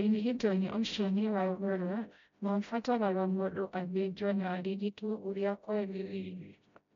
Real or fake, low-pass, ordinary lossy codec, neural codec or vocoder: fake; 7.2 kHz; MP3, 64 kbps; codec, 16 kHz, 0.5 kbps, FreqCodec, smaller model